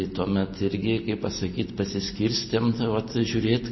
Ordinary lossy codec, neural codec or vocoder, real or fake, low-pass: MP3, 24 kbps; none; real; 7.2 kHz